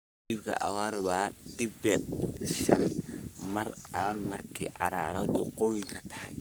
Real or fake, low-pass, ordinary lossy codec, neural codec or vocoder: fake; none; none; codec, 44.1 kHz, 3.4 kbps, Pupu-Codec